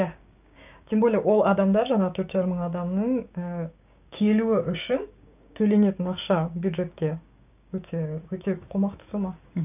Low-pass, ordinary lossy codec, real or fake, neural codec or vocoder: 3.6 kHz; none; fake; codec, 16 kHz, 6 kbps, DAC